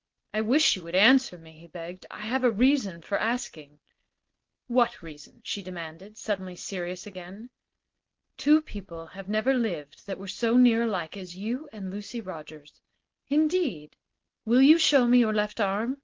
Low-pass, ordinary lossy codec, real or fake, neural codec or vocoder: 7.2 kHz; Opus, 16 kbps; real; none